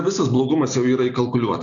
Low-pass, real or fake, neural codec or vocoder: 7.2 kHz; real; none